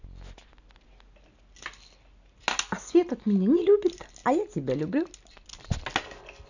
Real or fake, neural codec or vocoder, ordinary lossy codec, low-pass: real; none; none; 7.2 kHz